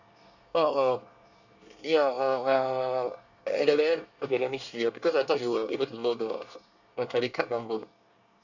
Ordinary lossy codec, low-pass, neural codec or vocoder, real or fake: none; 7.2 kHz; codec, 24 kHz, 1 kbps, SNAC; fake